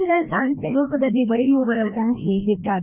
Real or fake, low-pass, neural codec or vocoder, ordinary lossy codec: fake; 3.6 kHz; codec, 16 kHz, 1 kbps, FreqCodec, larger model; none